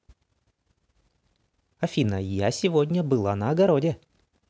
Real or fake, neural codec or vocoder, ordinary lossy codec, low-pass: real; none; none; none